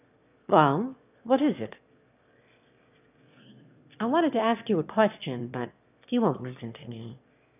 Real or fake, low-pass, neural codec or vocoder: fake; 3.6 kHz; autoencoder, 22.05 kHz, a latent of 192 numbers a frame, VITS, trained on one speaker